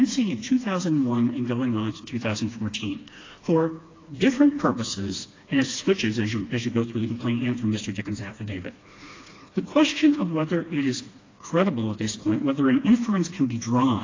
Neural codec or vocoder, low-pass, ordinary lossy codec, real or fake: codec, 16 kHz, 2 kbps, FreqCodec, smaller model; 7.2 kHz; AAC, 32 kbps; fake